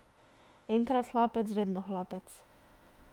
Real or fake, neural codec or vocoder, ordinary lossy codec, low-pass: fake; autoencoder, 48 kHz, 32 numbers a frame, DAC-VAE, trained on Japanese speech; Opus, 32 kbps; 19.8 kHz